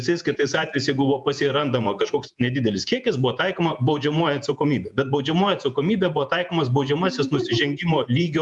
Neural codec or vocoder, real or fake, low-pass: vocoder, 48 kHz, 128 mel bands, Vocos; fake; 10.8 kHz